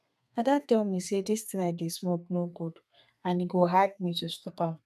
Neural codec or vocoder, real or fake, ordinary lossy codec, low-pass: codec, 32 kHz, 1.9 kbps, SNAC; fake; none; 14.4 kHz